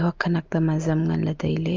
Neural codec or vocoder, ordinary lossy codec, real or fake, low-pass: none; Opus, 24 kbps; real; 7.2 kHz